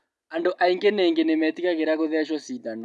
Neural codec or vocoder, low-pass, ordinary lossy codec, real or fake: none; none; none; real